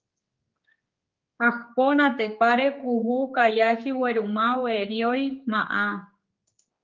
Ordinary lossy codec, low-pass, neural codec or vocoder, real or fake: Opus, 32 kbps; 7.2 kHz; codec, 16 kHz, 4 kbps, X-Codec, HuBERT features, trained on general audio; fake